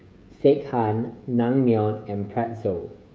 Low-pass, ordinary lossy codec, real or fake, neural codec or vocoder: none; none; fake; codec, 16 kHz, 16 kbps, FreqCodec, smaller model